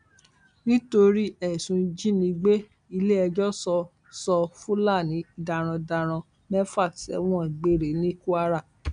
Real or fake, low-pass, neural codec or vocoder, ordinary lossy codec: real; 9.9 kHz; none; none